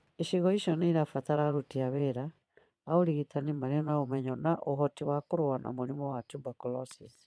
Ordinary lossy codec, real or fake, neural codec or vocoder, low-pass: none; fake; vocoder, 22.05 kHz, 80 mel bands, Vocos; none